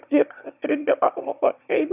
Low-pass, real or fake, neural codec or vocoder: 3.6 kHz; fake; autoencoder, 22.05 kHz, a latent of 192 numbers a frame, VITS, trained on one speaker